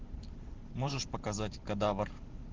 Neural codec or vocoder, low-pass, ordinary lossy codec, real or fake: vocoder, 44.1 kHz, 128 mel bands every 512 samples, BigVGAN v2; 7.2 kHz; Opus, 16 kbps; fake